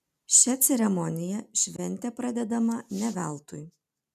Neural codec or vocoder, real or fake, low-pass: none; real; 14.4 kHz